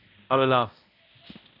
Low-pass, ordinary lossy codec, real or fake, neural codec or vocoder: 5.4 kHz; none; fake; codec, 16 kHz, 0.5 kbps, X-Codec, HuBERT features, trained on balanced general audio